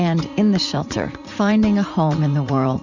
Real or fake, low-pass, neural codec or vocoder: fake; 7.2 kHz; vocoder, 44.1 kHz, 80 mel bands, Vocos